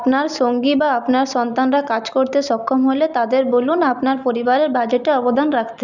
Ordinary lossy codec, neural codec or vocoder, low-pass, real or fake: none; none; 7.2 kHz; real